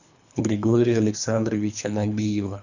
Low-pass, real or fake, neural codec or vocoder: 7.2 kHz; fake; codec, 24 kHz, 3 kbps, HILCodec